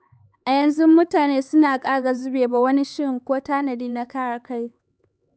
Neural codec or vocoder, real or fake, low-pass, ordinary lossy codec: codec, 16 kHz, 4 kbps, X-Codec, HuBERT features, trained on LibriSpeech; fake; none; none